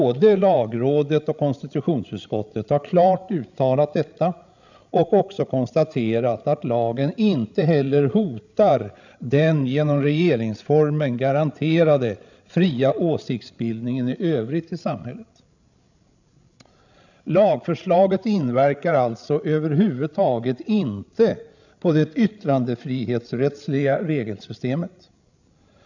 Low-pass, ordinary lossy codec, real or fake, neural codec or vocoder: 7.2 kHz; none; fake; codec, 16 kHz, 16 kbps, FreqCodec, larger model